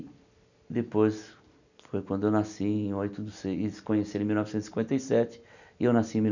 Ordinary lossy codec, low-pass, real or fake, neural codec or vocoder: none; 7.2 kHz; real; none